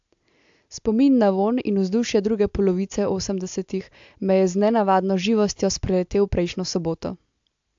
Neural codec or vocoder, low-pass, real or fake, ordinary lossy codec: none; 7.2 kHz; real; AAC, 64 kbps